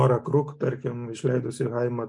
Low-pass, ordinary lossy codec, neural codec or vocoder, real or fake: 10.8 kHz; MP3, 64 kbps; none; real